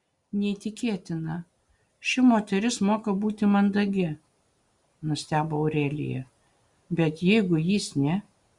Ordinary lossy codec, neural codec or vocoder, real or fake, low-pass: Opus, 64 kbps; none; real; 10.8 kHz